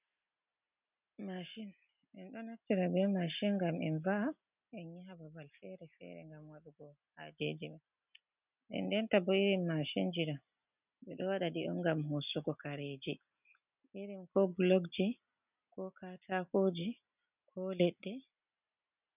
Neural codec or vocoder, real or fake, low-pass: none; real; 3.6 kHz